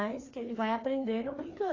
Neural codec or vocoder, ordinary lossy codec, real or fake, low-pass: codec, 16 kHz, 2 kbps, FreqCodec, larger model; AAC, 32 kbps; fake; 7.2 kHz